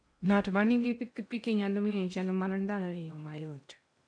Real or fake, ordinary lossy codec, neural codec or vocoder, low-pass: fake; none; codec, 16 kHz in and 24 kHz out, 0.6 kbps, FocalCodec, streaming, 2048 codes; 9.9 kHz